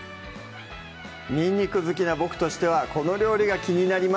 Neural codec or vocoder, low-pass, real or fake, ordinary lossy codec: none; none; real; none